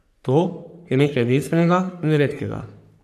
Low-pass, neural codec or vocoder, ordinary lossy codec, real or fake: 14.4 kHz; codec, 44.1 kHz, 3.4 kbps, Pupu-Codec; none; fake